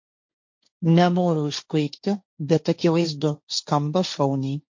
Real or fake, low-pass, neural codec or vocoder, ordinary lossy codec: fake; 7.2 kHz; codec, 16 kHz, 1.1 kbps, Voila-Tokenizer; MP3, 48 kbps